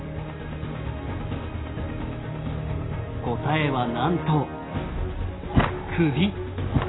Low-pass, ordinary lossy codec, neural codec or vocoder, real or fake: 7.2 kHz; AAC, 16 kbps; none; real